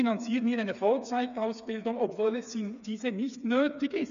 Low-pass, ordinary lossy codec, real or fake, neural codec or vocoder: 7.2 kHz; none; fake; codec, 16 kHz, 4 kbps, FreqCodec, smaller model